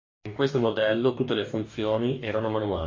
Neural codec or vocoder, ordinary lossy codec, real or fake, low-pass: codec, 44.1 kHz, 2.6 kbps, DAC; MP3, 48 kbps; fake; 7.2 kHz